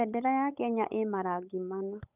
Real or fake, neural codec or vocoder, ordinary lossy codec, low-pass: fake; codec, 16 kHz, 8 kbps, FunCodec, trained on Chinese and English, 25 frames a second; none; 3.6 kHz